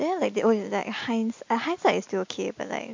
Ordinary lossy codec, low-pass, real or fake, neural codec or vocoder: MP3, 48 kbps; 7.2 kHz; real; none